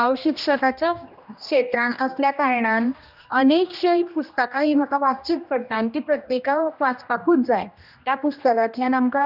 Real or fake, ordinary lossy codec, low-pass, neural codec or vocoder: fake; none; 5.4 kHz; codec, 16 kHz, 1 kbps, X-Codec, HuBERT features, trained on general audio